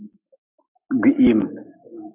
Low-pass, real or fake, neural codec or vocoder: 3.6 kHz; fake; codec, 44.1 kHz, 7.8 kbps, Pupu-Codec